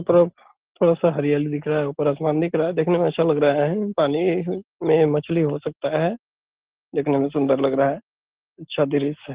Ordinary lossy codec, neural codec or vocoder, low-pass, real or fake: Opus, 16 kbps; none; 3.6 kHz; real